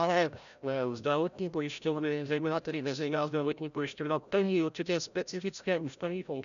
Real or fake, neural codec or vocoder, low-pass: fake; codec, 16 kHz, 0.5 kbps, FreqCodec, larger model; 7.2 kHz